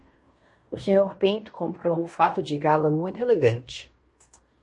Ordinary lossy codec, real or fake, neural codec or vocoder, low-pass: MP3, 48 kbps; fake; codec, 16 kHz in and 24 kHz out, 0.9 kbps, LongCat-Audio-Codec, fine tuned four codebook decoder; 10.8 kHz